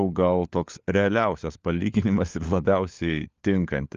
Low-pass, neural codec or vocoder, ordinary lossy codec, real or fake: 7.2 kHz; codec, 16 kHz, 4 kbps, FunCodec, trained on LibriTTS, 50 frames a second; Opus, 32 kbps; fake